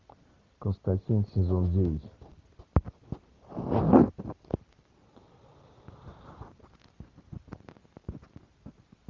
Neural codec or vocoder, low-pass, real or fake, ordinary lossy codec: none; 7.2 kHz; real; Opus, 32 kbps